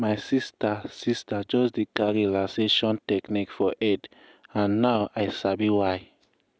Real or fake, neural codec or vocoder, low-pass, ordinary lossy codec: real; none; none; none